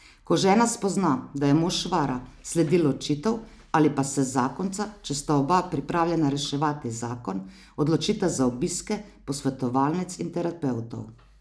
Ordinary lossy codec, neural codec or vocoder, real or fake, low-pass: none; none; real; none